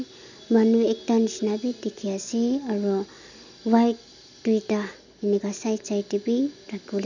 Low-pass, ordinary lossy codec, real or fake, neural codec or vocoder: 7.2 kHz; none; real; none